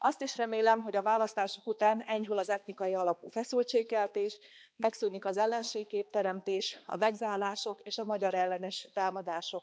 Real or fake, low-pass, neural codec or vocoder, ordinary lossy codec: fake; none; codec, 16 kHz, 2 kbps, X-Codec, HuBERT features, trained on balanced general audio; none